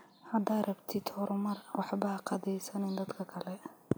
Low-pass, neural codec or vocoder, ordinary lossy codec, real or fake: none; none; none; real